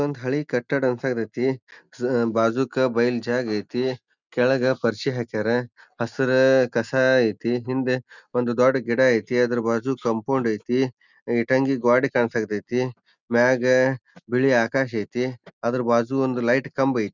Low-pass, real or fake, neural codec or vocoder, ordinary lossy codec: 7.2 kHz; real; none; none